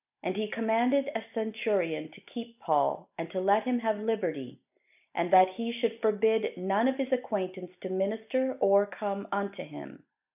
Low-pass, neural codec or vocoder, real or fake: 3.6 kHz; none; real